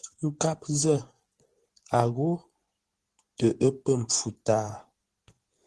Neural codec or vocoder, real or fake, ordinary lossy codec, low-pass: vocoder, 24 kHz, 100 mel bands, Vocos; fake; Opus, 16 kbps; 10.8 kHz